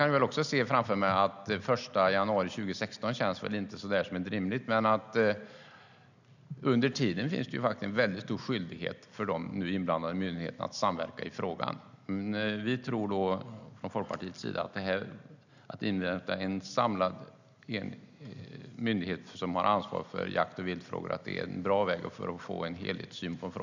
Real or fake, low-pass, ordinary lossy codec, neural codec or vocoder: real; 7.2 kHz; none; none